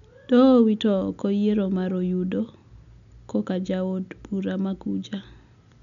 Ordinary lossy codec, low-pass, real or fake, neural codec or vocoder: none; 7.2 kHz; real; none